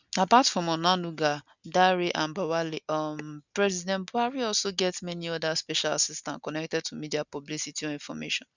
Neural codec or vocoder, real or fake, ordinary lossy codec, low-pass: none; real; none; 7.2 kHz